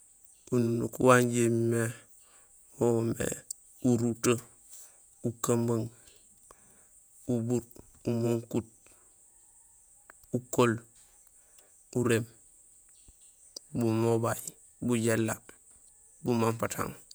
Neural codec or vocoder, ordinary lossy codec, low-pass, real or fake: vocoder, 48 kHz, 128 mel bands, Vocos; none; none; fake